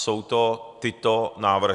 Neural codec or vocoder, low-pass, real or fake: none; 10.8 kHz; real